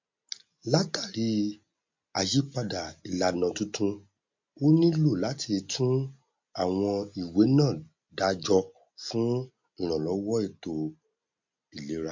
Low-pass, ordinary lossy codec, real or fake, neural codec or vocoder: 7.2 kHz; MP3, 48 kbps; real; none